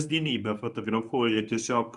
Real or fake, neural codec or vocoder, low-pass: fake; codec, 24 kHz, 0.9 kbps, WavTokenizer, medium speech release version 1; 10.8 kHz